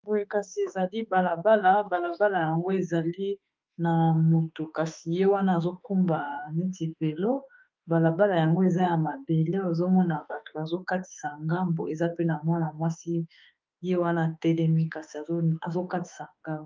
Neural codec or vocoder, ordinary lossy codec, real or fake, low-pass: autoencoder, 48 kHz, 32 numbers a frame, DAC-VAE, trained on Japanese speech; Opus, 32 kbps; fake; 7.2 kHz